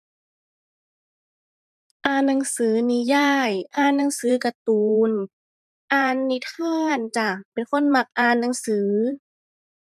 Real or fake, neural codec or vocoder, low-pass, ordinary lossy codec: fake; vocoder, 44.1 kHz, 128 mel bands every 512 samples, BigVGAN v2; 14.4 kHz; none